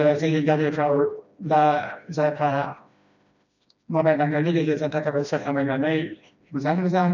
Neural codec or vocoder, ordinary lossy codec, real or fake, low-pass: codec, 16 kHz, 1 kbps, FreqCodec, smaller model; none; fake; 7.2 kHz